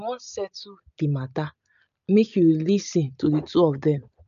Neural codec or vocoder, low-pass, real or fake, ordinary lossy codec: none; 7.2 kHz; real; MP3, 96 kbps